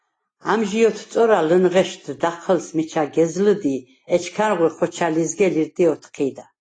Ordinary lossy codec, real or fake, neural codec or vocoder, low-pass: AAC, 32 kbps; real; none; 9.9 kHz